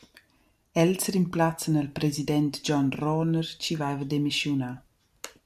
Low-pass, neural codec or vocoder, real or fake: 14.4 kHz; none; real